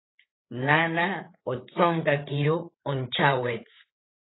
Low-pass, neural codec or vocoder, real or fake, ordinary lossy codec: 7.2 kHz; codec, 16 kHz, 8 kbps, FreqCodec, larger model; fake; AAC, 16 kbps